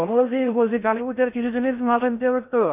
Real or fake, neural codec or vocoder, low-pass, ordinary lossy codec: fake; codec, 16 kHz in and 24 kHz out, 0.6 kbps, FocalCodec, streaming, 4096 codes; 3.6 kHz; none